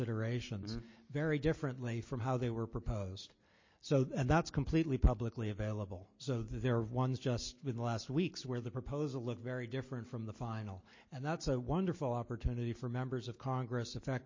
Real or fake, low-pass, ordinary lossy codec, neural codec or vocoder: real; 7.2 kHz; MP3, 32 kbps; none